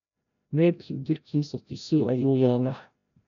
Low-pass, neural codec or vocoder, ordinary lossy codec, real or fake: 7.2 kHz; codec, 16 kHz, 0.5 kbps, FreqCodec, larger model; none; fake